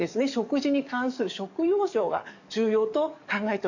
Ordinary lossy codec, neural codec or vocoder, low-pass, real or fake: none; codec, 44.1 kHz, 7.8 kbps, DAC; 7.2 kHz; fake